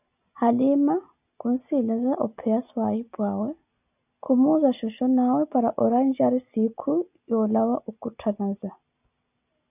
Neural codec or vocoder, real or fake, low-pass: none; real; 3.6 kHz